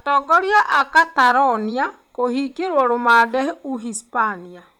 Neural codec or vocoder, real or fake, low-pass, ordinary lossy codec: vocoder, 44.1 kHz, 128 mel bands, Pupu-Vocoder; fake; 19.8 kHz; none